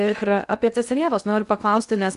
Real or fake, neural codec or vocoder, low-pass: fake; codec, 16 kHz in and 24 kHz out, 0.6 kbps, FocalCodec, streaming, 2048 codes; 10.8 kHz